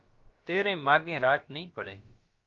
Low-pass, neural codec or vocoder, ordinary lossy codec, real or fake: 7.2 kHz; codec, 16 kHz, about 1 kbps, DyCAST, with the encoder's durations; Opus, 32 kbps; fake